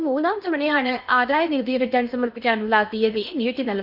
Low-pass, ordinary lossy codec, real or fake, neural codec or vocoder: 5.4 kHz; none; fake; codec, 16 kHz in and 24 kHz out, 0.6 kbps, FocalCodec, streaming, 2048 codes